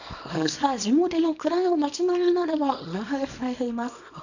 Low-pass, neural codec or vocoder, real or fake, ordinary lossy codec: 7.2 kHz; codec, 24 kHz, 0.9 kbps, WavTokenizer, small release; fake; none